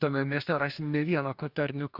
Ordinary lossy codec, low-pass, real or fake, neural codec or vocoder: MP3, 48 kbps; 5.4 kHz; fake; codec, 44.1 kHz, 2.6 kbps, DAC